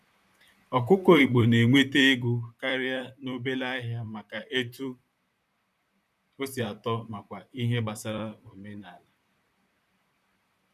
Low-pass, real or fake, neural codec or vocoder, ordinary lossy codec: 14.4 kHz; fake; vocoder, 44.1 kHz, 128 mel bands, Pupu-Vocoder; none